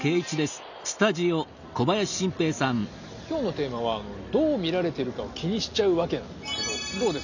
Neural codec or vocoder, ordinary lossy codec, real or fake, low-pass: none; none; real; 7.2 kHz